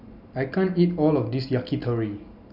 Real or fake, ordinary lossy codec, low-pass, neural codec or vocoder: real; none; 5.4 kHz; none